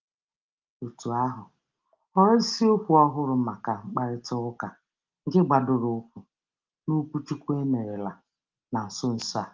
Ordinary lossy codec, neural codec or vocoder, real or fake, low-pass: Opus, 32 kbps; none; real; 7.2 kHz